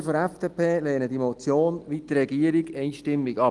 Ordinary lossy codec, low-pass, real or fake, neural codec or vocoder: Opus, 24 kbps; 10.8 kHz; real; none